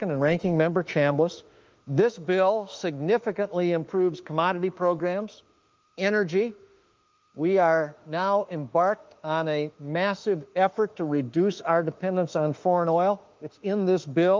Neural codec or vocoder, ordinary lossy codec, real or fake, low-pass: autoencoder, 48 kHz, 32 numbers a frame, DAC-VAE, trained on Japanese speech; Opus, 24 kbps; fake; 7.2 kHz